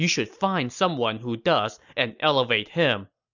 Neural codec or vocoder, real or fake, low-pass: none; real; 7.2 kHz